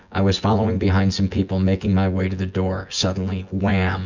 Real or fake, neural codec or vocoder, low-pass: fake; vocoder, 24 kHz, 100 mel bands, Vocos; 7.2 kHz